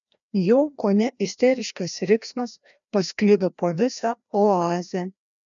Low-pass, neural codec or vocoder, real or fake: 7.2 kHz; codec, 16 kHz, 1 kbps, FreqCodec, larger model; fake